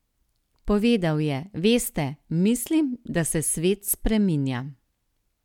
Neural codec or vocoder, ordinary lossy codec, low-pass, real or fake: none; none; 19.8 kHz; real